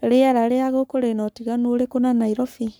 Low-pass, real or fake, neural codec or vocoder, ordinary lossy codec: none; fake; codec, 44.1 kHz, 7.8 kbps, Pupu-Codec; none